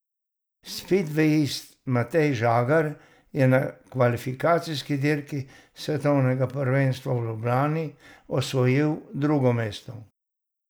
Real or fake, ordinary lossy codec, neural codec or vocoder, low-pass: real; none; none; none